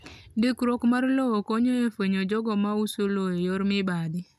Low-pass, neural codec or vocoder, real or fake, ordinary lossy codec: 14.4 kHz; none; real; none